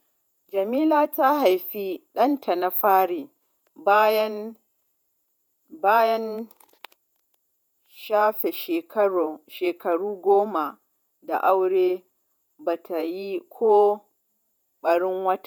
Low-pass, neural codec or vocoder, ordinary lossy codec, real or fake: none; vocoder, 48 kHz, 128 mel bands, Vocos; none; fake